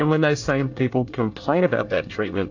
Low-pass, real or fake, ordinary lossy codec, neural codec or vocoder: 7.2 kHz; fake; AAC, 48 kbps; codec, 24 kHz, 1 kbps, SNAC